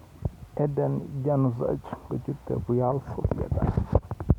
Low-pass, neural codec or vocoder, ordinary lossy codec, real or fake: 19.8 kHz; none; none; real